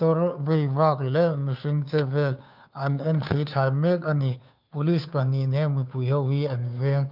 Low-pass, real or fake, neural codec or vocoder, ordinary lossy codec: 5.4 kHz; fake; codec, 16 kHz, 2 kbps, FunCodec, trained on Chinese and English, 25 frames a second; none